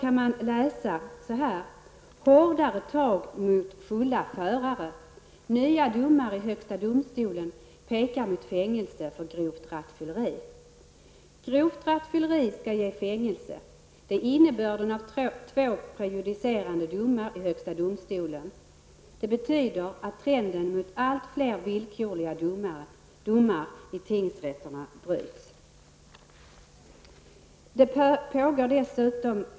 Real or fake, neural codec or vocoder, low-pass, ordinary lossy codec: real; none; none; none